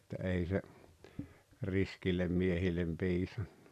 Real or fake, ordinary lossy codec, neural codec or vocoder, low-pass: real; none; none; 14.4 kHz